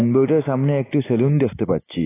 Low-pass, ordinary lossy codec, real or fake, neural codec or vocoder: 3.6 kHz; AAC, 16 kbps; real; none